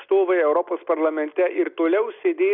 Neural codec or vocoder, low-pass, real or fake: none; 5.4 kHz; real